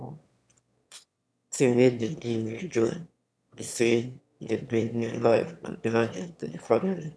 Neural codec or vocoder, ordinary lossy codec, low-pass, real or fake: autoencoder, 22.05 kHz, a latent of 192 numbers a frame, VITS, trained on one speaker; none; none; fake